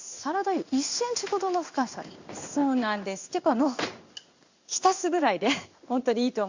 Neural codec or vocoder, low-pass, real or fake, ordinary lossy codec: codec, 16 kHz in and 24 kHz out, 1 kbps, XY-Tokenizer; 7.2 kHz; fake; Opus, 64 kbps